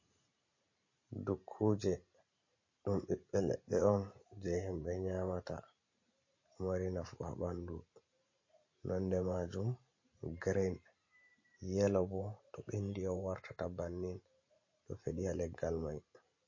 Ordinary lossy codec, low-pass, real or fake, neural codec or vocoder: MP3, 32 kbps; 7.2 kHz; fake; vocoder, 44.1 kHz, 128 mel bands every 512 samples, BigVGAN v2